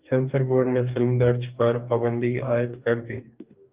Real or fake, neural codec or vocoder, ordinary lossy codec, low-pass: fake; codec, 44.1 kHz, 2.6 kbps, DAC; Opus, 32 kbps; 3.6 kHz